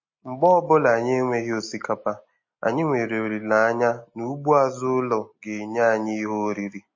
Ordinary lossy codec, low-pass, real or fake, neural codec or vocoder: MP3, 32 kbps; 7.2 kHz; real; none